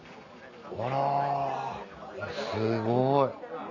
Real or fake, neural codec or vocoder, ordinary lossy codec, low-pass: real; none; none; 7.2 kHz